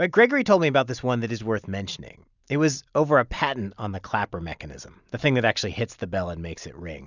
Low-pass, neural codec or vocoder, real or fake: 7.2 kHz; none; real